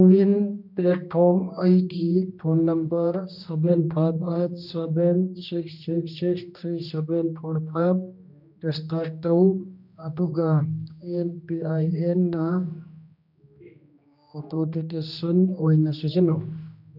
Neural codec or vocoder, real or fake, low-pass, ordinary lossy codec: codec, 16 kHz, 1 kbps, X-Codec, HuBERT features, trained on general audio; fake; 5.4 kHz; none